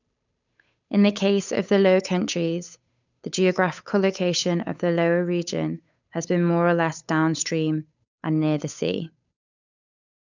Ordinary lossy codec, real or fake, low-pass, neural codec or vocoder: none; fake; 7.2 kHz; codec, 16 kHz, 8 kbps, FunCodec, trained on Chinese and English, 25 frames a second